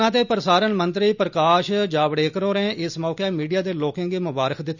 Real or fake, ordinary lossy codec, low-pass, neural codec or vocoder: real; none; 7.2 kHz; none